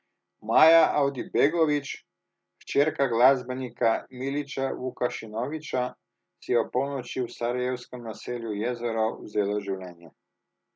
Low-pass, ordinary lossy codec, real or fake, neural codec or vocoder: none; none; real; none